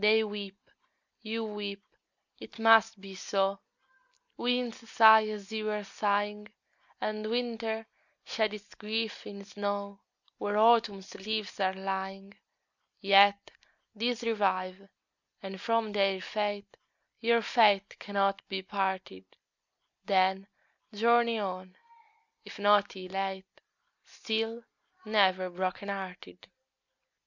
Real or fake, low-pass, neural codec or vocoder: real; 7.2 kHz; none